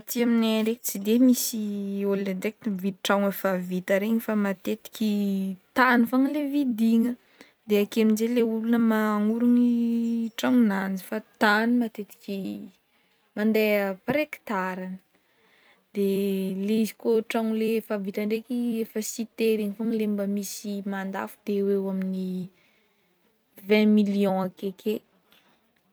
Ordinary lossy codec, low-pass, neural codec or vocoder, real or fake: none; none; vocoder, 44.1 kHz, 128 mel bands every 256 samples, BigVGAN v2; fake